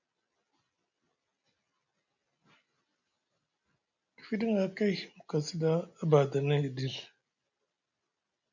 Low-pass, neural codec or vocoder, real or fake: 7.2 kHz; none; real